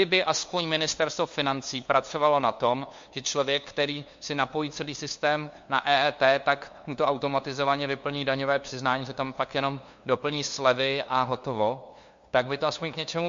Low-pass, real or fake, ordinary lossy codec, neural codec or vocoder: 7.2 kHz; fake; MP3, 48 kbps; codec, 16 kHz, 2 kbps, FunCodec, trained on LibriTTS, 25 frames a second